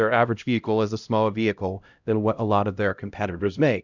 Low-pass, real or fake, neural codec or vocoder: 7.2 kHz; fake; codec, 16 kHz, 0.5 kbps, X-Codec, HuBERT features, trained on LibriSpeech